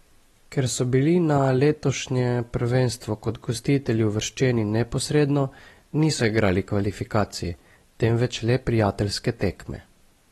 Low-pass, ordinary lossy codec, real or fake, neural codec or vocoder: 19.8 kHz; AAC, 32 kbps; real; none